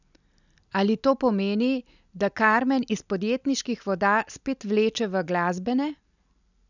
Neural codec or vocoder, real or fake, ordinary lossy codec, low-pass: none; real; none; 7.2 kHz